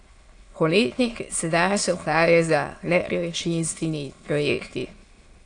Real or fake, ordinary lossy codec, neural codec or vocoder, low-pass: fake; AAC, 48 kbps; autoencoder, 22.05 kHz, a latent of 192 numbers a frame, VITS, trained on many speakers; 9.9 kHz